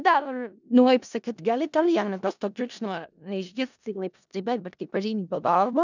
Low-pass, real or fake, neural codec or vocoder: 7.2 kHz; fake; codec, 16 kHz in and 24 kHz out, 0.4 kbps, LongCat-Audio-Codec, four codebook decoder